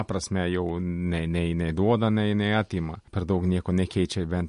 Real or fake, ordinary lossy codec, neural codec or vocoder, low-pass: real; MP3, 48 kbps; none; 14.4 kHz